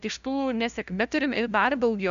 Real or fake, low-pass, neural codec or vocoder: fake; 7.2 kHz; codec, 16 kHz, 0.5 kbps, FunCodec, trained on LibriTTS, 25 frames a second